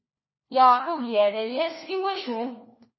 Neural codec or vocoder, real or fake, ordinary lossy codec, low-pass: codec, 16 kHz, 1 kbps, FunCodec, trained on LibriTTS, 50 frames a second; fake; MP3, 24 kbps; 7.2 kHz